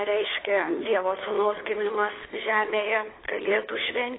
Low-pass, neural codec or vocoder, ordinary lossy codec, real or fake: 7.2 kHz; codec, 16 kHz, 4 kbps, FunCodec, trained on LibriTTS, 50 frames a second; AAC, 16 kbps; fake